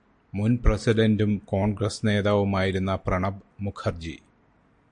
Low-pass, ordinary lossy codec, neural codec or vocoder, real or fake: 9.9 kHz; MP3, 64 kbps; none; real